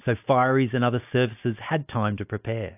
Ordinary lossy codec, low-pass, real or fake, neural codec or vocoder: AAC, 32 kbps; 3.6 kHz; real; none